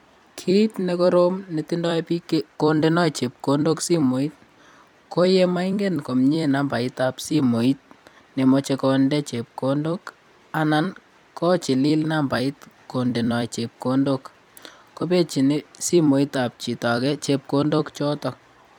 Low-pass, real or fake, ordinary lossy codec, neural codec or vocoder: 19.8 kHz; fake; none; vocoder, 44.1 kHz, 128 mel bands every 256 samples, BigVGAN v2